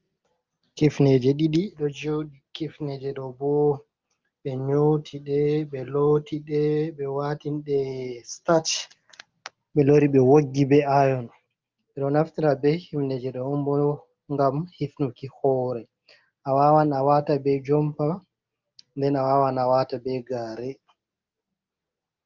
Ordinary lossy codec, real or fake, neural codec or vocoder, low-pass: Opus, 24 kbps; real; none; 7.2 kHz